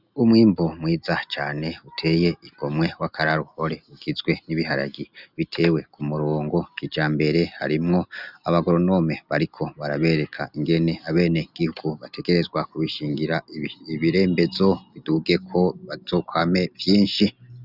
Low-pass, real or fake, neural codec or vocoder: 5.4 kHz; real; none